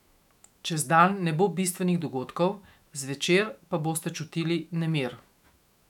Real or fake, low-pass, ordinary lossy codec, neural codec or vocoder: fake; 19.8 kHz; none; autoencoder, 48 kHz, 128 numbers a frame, DAC-VAE, trained on Japanese speech